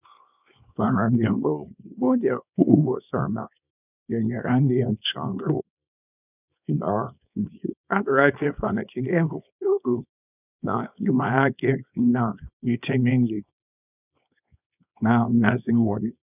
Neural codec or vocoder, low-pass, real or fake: codec, 24 kHz, 0.9 kbps, WavTokenizer, small release; 3.6 kHz; fake